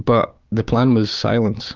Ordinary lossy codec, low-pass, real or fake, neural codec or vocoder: Opus, 24 kbps; 7.2 kHz; fake; codec, 16 kHz, 6 kbps, DAC